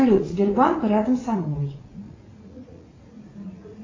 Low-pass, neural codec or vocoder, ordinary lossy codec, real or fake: 7.2 kHz; vocoder, 44.1 kHz, 80 mel bands, Vocos; AAC, 32 kbps; fake